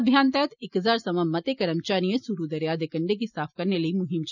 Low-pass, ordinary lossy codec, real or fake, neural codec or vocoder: 7.2 kHz; none; real; none